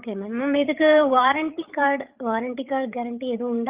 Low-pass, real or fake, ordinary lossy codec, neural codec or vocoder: 3.6 kHz; fake; Opus, 32 kbps; codec, 16 kHz, 8 kbps, FreqCodec, larger model